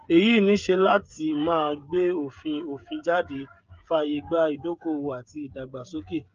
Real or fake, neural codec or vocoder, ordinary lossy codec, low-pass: fake; codec, 16 kHz, 8 kbps, FreqCodec, smaller model; Opus, 24 kbps; 7.2 kHz